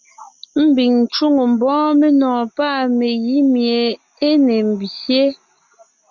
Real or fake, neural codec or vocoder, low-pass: real; none; 7.2 kHz